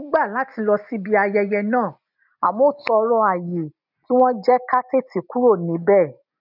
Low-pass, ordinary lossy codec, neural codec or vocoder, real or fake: 5.4 kHz; none; none; real